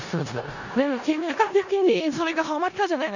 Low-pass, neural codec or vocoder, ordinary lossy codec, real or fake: 7.2 kHz; codec, 16 kHz in and 24 kHz out, 0.4 kbps, LongCat-Audio-Codec, four codebook decoder; none; fake